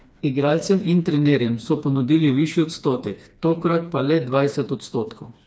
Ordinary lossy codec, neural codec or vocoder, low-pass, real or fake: none; codec, 16 kHz, 2 kbps, FreqCodec, smaller model; none; fake